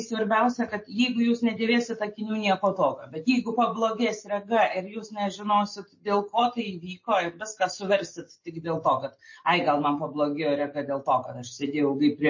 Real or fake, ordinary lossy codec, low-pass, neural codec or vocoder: real; MP3, 32 kbps; 7.2 kHz; none